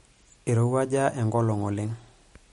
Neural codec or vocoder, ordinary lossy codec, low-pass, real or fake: none; MP3, 48 kbps; 19.8 kHz; real